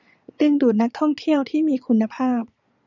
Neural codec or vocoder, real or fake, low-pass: vocoder, 22.05 kHz, 80 mel bands, Vocos; fake; 7.2 kHz